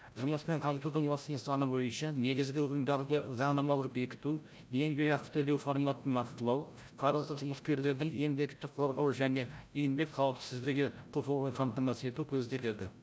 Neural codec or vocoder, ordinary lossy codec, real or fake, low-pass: codec, 16 kHz, 0.5 kbps, FreqCodec, larger model; none; fake; none